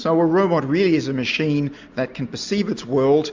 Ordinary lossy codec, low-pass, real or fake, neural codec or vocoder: MP3, 64 kbps; 7.2 kHz; real; none